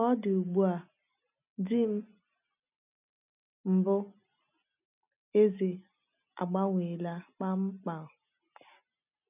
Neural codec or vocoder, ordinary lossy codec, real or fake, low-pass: none; none; real; 3.6 kHz